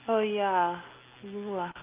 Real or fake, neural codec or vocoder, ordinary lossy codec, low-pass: real; none; Opus, 24 kbps; 3.6 kHz